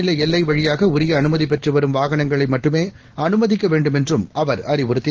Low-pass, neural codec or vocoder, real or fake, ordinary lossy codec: 7.2 kHz; none; real; Opus, 16 kbps